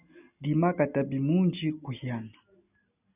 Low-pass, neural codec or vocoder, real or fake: 3.6 kHz; none; real